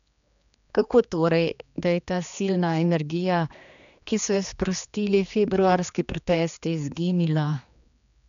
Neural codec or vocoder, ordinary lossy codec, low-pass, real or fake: codec, 16 kHz, 2 kbps, X-Codec, HuBERT features, trained on general audio; none; 7.2 kHz; fake